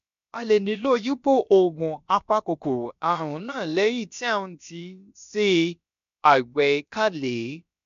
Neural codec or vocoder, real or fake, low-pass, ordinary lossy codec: codec, 16 kHz, about 1 kbps, DyCAST, with the encoder's durations; fake; 7.2 kHz; MP3, 64 kbps